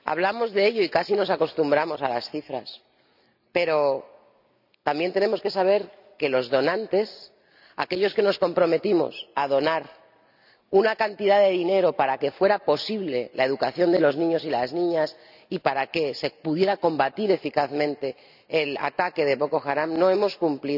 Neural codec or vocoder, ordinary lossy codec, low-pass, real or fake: none; none; 5.4 kHz; real